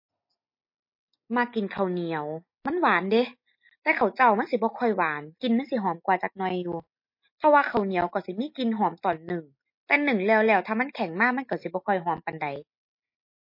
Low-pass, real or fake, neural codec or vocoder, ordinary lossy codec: 5.4 kHz; real; none; MP3, 32 kbps